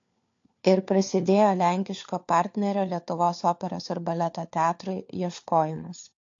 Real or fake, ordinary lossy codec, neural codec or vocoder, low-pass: fake; AAC, 48 kbps; codec, 16 kHz, 4 kbps, FunCodec, trained on LibriTTS, 50 frames a second; 7.2 kHz